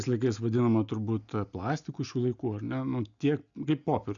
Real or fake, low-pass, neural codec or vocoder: real; 7.2 kHz; none